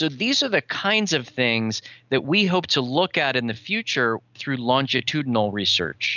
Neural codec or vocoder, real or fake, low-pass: none; real; 7.2 kHz